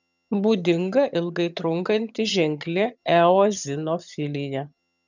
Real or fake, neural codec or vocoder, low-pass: fake; vocoder, 22.05 kHz, 80 mel bands, HiFi-GAN; 7.2 kHz